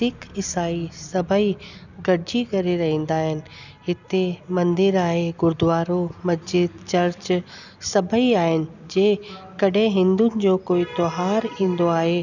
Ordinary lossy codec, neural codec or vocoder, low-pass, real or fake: none; none; 7.2 kHz; real